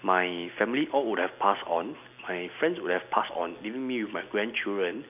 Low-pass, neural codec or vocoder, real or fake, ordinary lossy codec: 3.6 kHz; none; real; none